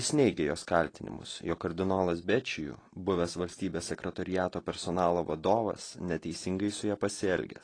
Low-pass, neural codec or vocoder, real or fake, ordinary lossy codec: 9.9 kHz; none; real; AAC, 32 kbps